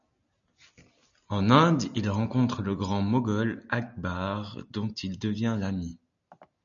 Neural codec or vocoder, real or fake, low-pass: none; real; 7.2 kHz